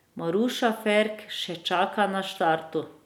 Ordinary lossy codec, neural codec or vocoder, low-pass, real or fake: none; none; 19.8 kHz; real